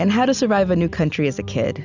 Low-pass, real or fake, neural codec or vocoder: 7.2 kHz; real; none